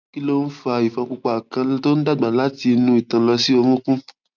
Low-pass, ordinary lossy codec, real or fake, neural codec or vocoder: 7.2 kHz; none; real; none